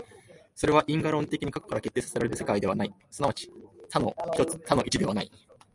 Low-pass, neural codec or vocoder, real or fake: 10.8 kHz; none; real